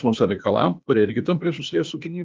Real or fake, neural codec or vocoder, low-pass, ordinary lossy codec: fake; codec, 16 kHz, 0.8 kbps, ZipCodec; 7.2 kHz; Opus, 32 kbps